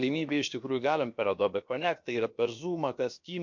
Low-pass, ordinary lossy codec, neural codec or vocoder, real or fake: 7.2 kHz; MP3, 48 kbps; codec, 16 kHz, about 1 kbps, DyCAST, with the encoder's durations; fake